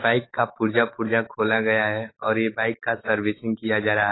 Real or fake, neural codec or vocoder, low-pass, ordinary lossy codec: fake; autoencoder, 48 kHz, 128 numbers a frame, DAC-VAE, trained on Japanese speech; 7.2 kHz; AAC, 16 kbps